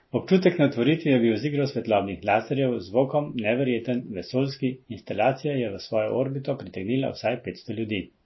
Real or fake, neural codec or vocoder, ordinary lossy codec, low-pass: real; none; MP3, 24 kbps; 7.2 kHz